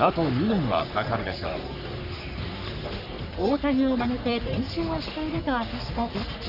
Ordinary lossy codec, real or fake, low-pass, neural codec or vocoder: none; fake; 5.4 kHz; codec, 44.1 kHz, 3.4 kbps, Pupu-Codec